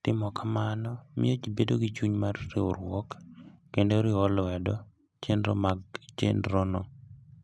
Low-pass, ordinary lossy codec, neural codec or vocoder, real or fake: none; none; none; real